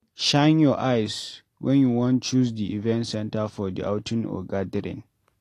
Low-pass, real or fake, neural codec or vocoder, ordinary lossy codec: 14.4 kHz; real; none; AAC, 48 kbps